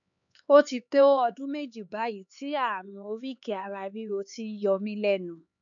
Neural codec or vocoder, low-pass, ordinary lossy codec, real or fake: codec, 16 kHz, 4 kbps, X-Codec, HuBERT features, trained on LibriSpeech; 7.2 kHz; none; fake